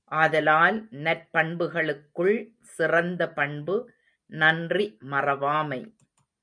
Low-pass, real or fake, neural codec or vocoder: 9.9 kHz; real; none